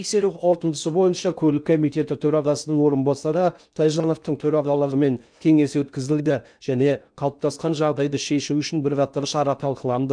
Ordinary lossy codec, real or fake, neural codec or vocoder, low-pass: none; fake; codec, 16 kHz in and 24 kHz out, 0.6 kbps, FocalCodec, streaming, 4096 codes; 9.9 kHz